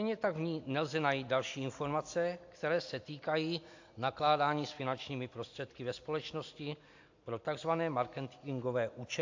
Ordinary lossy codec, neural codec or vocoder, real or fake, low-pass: AAC, 48 kbps; none; real; 7.2 kHz